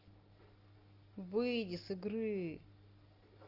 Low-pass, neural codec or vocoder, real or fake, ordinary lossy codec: 5.4 kHz; none; real; Opus, 32 kbps